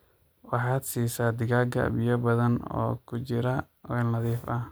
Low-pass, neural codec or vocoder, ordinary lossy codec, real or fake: none; none; none; real